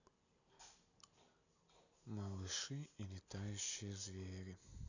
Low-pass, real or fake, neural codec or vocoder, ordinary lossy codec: 7.2 kHz; real; none; none